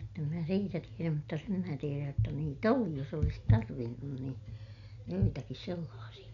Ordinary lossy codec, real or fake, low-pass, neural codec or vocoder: none; real; 7.2 kHz; none